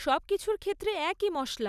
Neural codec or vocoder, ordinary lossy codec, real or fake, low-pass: none; none; real; 14.4 kHz